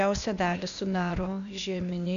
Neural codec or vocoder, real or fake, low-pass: codec, 16 kHz, 0.8 kbps, ZipCodec; fake; 7.2 kHz